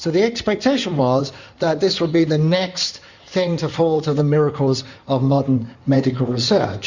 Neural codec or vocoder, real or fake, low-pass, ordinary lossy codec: codec, 16 kHz in and 24 kHz out, 2.2 kbps, FireRedTTS-2 codec; fake; 7.2 kHz; Opus, 64 kbps